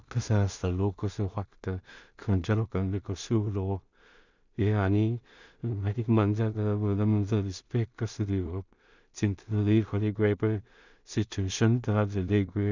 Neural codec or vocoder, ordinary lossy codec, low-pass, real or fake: codec, 16 kHz in and 24 kHz out, 0.4 kbps, LongCat-Audio-Codec, two codebook decoder; none; 7.2 kHz; fake